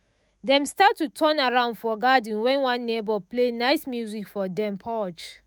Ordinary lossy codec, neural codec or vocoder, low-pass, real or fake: none; autoencoder, 48 kHz, 128 numbers a frame, DAC-VAE, trained on Japanese speech; none; fake